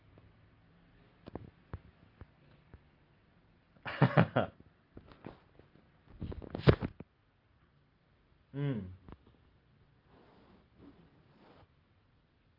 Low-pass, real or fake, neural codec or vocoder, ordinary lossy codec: 5.4 kHz; real; none; Opus, 32 kbps